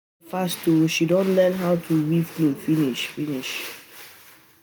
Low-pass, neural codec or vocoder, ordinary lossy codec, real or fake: none; none; none; real